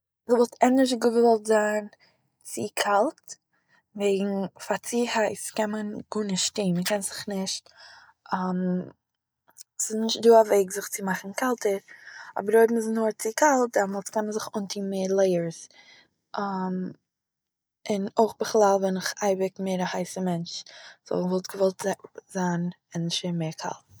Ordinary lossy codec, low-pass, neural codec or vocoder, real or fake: none; none; none; real